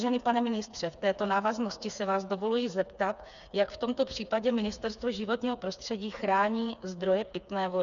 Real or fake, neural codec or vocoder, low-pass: fake; codec, 16 kHz, 4 kbps, FreqCodec, smaller model; 7.2 kHz